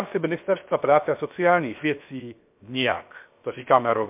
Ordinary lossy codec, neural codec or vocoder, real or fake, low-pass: MP3, 32 kbps; codec, 16 kHz, 0.7 kbps, FocalCodec; fake; 3.6 kHz